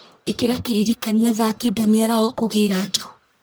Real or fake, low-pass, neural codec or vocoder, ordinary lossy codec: fake; none; codec, 44.1 kHz, 1.7 kbps, Pupu-Codec; none